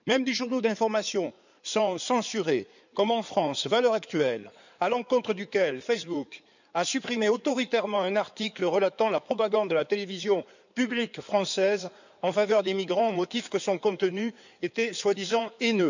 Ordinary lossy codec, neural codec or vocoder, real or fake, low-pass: none; codec, 16 kHz in and 24 kHz out, 2.2 kbps, FireRedTTS-2 codec; fake; 7.2 kHz